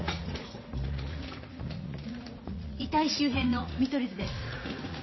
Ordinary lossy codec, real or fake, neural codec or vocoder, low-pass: MP3, 24 kbps; fake; vocoder, 44.1 kHz, 80 mel bands, Vocos; 7.2 kHz